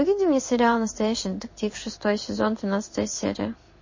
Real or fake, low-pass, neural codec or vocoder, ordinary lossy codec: fake; 7.2 kHz; codec, 16 kHz in and 24 kHz out, 1 kbps, XY-Tokenizer; MP3, 32 kbps